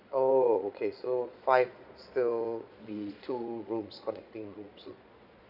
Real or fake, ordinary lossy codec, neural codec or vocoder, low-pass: fake; none; vocoder, 22.05 kHz, 80 mel bands, Vocos; 5.4 kHz